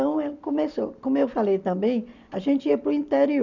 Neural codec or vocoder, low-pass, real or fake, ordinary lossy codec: none; 7.2 kHz; real; none